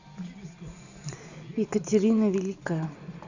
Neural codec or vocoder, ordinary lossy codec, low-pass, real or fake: none; Opus, 64 kbps; 7.2 kHz; real